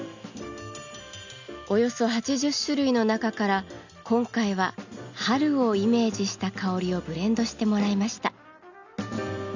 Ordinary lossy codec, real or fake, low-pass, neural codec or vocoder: none; real; 7.2 kHz; none